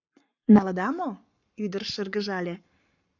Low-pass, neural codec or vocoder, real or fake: 7.2 kHz; codec, 44.1 kHz, 7.8 kbps, Pupu-Codec; fake